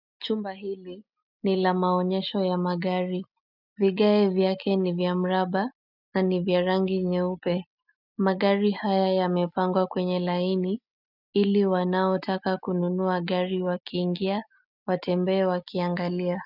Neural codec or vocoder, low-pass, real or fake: none; 5.4 kHz; real